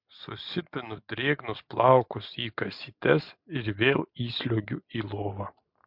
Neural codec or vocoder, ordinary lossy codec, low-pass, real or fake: none; MP3, 48 kbps; 5.4 kHz; real